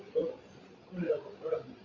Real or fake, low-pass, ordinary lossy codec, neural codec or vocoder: fake; 7.2 kHz; Opus, 64 kbps; codec, 16 kHz, 8 kbps, FreqCodec, larger model